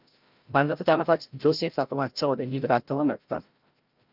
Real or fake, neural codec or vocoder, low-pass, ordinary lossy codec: fake; codec, 16 kHz, 0.5 kbps, FreqCodec, larger model; 5.4 kHz; Opus, 24 kbps